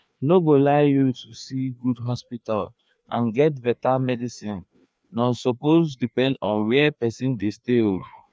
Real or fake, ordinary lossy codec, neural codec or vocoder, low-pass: fake; none; codec, 16 kHz, 2 kbps, FreqCodec, larger model; none